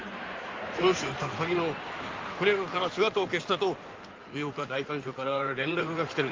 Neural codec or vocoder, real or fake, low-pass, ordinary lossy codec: codec, 16 kHz in and 24 kHz out, 2.2 kbps, FireRedTTS-2 codec; fake; 7.2 kHz; Opus, 32 kbps